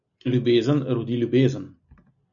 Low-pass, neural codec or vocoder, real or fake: 7.2 kHz; none; real